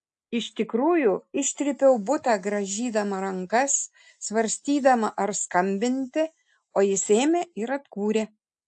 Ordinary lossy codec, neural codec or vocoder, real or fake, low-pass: AAC, 64 kbps; none; real; 10.8 kHz